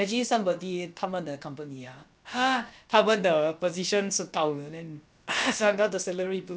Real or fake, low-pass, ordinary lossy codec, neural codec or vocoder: fake; none; none; codec, 16 kHz, about 1 kbps, DyCAST, with the encoder's durations